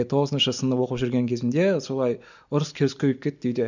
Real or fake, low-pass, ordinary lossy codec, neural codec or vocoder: real; 7.2 kHz; none; none